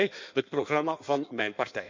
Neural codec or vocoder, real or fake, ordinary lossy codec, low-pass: codec, 16 kHz in and 24 kHz out, 1.1 kbps, FireRedTTS-2 codec; fake; MP3, 64 kbps; 7.2 kHz